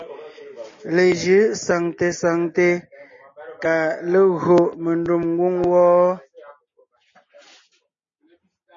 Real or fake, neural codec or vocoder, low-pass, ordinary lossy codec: real; none; 7.2 kHz; MP3, 32 kbps